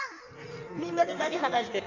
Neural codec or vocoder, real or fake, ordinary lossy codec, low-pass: codec, 16 kHz in and 24 kHz out, 1.1 kbps, FireRedTTS-2 codec; fake; none; 7.2 kHz